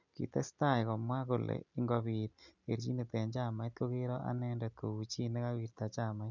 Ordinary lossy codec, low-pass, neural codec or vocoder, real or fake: none; 7.2 kHz; none; real